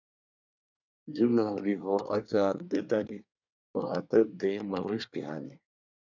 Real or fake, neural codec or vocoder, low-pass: fake; codec, 24 kHz, 1 kbps, SNAC; 7.2 kHz